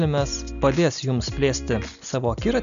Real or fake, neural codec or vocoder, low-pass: real; none; 7.2 kHz